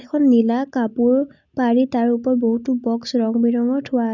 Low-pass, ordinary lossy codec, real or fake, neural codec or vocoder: 7.2 kHz; none; real; none